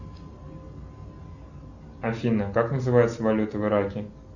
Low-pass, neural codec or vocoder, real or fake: 7.2 kHz; none; real